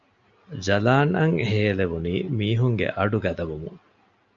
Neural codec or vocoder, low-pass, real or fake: none; 7.2 kHz; real